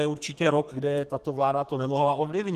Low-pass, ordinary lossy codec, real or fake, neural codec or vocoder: 14.4 kHz; Opus, 24 kbps; fake; codec, 32 kHz, 1.9 kbps, SNAC